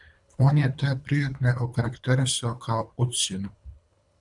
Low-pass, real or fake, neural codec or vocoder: 10.8 kHz; fake; codec, 24 kHz, 3 kbps, HILCodec